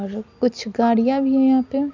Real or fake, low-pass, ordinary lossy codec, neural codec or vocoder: real; 7.2 kHz; none; none